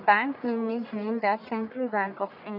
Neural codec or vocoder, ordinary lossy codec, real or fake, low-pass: codec, 44.1 kHz, 1.7 kbps, Pupu-Codec; none; fake; 5.4 kHz